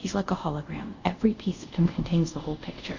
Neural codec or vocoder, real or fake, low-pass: codec, 24 kHz, 0.5 kbps, DualCodec; fake; 7.2 kHz